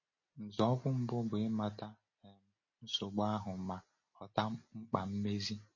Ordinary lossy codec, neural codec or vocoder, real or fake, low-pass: MP3, 32 kbps; none; real; 7.2 kHz